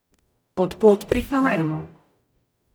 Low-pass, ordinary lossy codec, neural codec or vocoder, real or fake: none; none; codec, 44.1 kHz, 0.9 kbps, DAC; fake